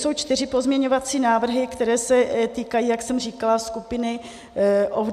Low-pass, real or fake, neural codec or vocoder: 14.4 kHz; real; none